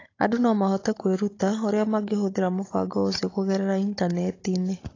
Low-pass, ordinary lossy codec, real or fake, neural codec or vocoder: 7.2 kHz; AAC, 32 kbps; fake; codec, 16 kHz, 16 kbps, FunCodec, trained on Chinese and English, 50 frames a second